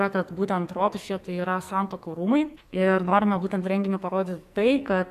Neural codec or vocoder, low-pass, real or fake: codec, 44.1 kHz, 2.6 kbps, SNAC; 14.4 kHz; fake